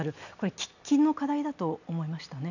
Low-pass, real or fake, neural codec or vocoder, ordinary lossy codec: 7.2 kHz; real; none; none